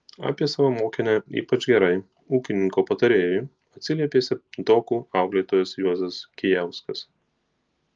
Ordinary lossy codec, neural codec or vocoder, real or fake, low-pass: Opus, 24 kbps; none; real; 7.2 kHz